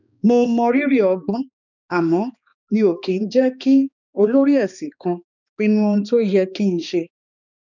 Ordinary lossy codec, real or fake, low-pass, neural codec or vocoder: none; fake; 7.2 kHz; codec, 16 kHz, 2 kbps, X-Codec, HuBERT features, trained on balanced general audio